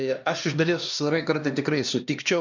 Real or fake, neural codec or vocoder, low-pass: fake; codec, 16 kHz, 1 kbps, X-Codec, HuBERT features, trained on LibriSpeech; 7.2 kHz